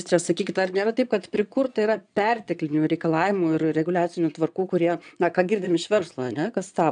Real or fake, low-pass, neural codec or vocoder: fake; 9.9 kHz; vocoder, 22.05 kHz, 80 mel bands, Vocos